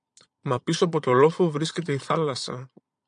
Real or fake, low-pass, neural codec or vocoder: fake; 9.9 kHz; vocoder, 22.05 kHz, 80 mel bands, Vocos